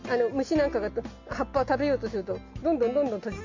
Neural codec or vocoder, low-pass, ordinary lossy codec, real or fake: none; 7.2 kHz; MP3, 48 kbps; real